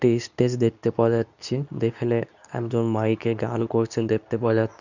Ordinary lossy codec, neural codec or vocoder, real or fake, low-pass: none; codec, 24 kHz, 0.9 kbps, WavTokenizer, medium speech release version 2; fake; 7.2 kHz